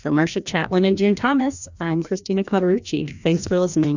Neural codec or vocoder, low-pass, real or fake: codec, 16 kHz, 1 kbps, FreqCodec, larger model; 7.2 kHz; fake